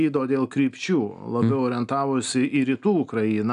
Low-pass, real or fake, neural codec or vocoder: 10.8 kHz; real; none